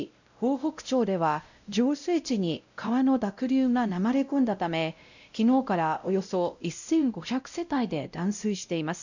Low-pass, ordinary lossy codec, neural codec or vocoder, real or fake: 7.2 kHz; none; codec, 16 kHz, 0.5 kbps, X-Codec, WavLM features, trained on Multilingual LibriSpeech; fake